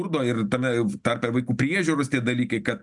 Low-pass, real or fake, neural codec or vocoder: 10.8 kHz; real; none